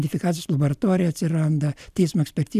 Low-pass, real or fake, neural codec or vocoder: 14.4 kHz; real; none